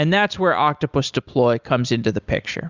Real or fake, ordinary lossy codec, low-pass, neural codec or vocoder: real; Opus, 64 kbps; 7.2 kHz; none